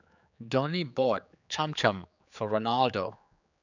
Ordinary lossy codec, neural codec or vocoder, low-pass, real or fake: none; codec, 16 kHz, 4 kbps, X-Codec, HuBERT features, trained on general audio; 7.2 kHz; fake